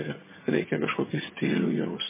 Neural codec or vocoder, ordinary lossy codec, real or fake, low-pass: vocoder, 22.05 kHz, 80 mel bands, HiFi-GAN; MP3, 16 kbps; fake; 3.6 kHz